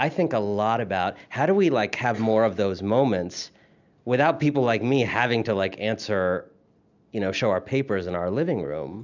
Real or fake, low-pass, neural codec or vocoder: real; 7.2 kHz; none